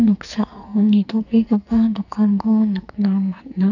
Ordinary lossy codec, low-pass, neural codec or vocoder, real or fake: none; 7.2 kHz; codec, 44.1 kHz, 2.6 kbps, SNAC; fake